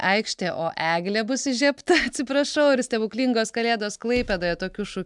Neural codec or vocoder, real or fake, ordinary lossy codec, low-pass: none; real; MP3, 96 kbps; 10.8 kHz